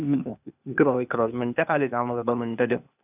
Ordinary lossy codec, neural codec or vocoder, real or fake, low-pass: none; codec, 16 kHz, 0.8 kbps, ZipCodec; fake; 3.6 kHz